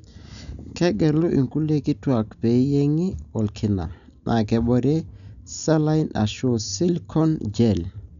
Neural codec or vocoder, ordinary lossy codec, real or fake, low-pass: none; none; real; 7.2 kHz